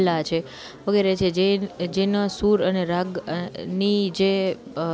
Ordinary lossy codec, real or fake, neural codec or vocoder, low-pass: none; real; none; none